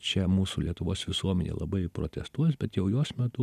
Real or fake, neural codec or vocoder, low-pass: real; none; 14.4 kHz